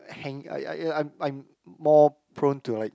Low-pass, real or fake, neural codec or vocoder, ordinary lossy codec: none; real; none; none